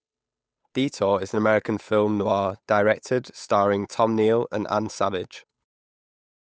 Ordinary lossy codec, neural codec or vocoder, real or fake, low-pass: none; codec, 16 kHz, 8 kbps, FunCodec, trained on Chinese and English, 25 frames a second; fake; none